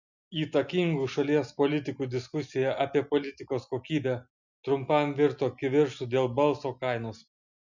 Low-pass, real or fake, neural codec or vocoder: 7.2 kHz; real; none